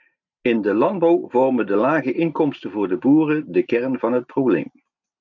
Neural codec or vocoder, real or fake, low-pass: none; real; 7.2 kHz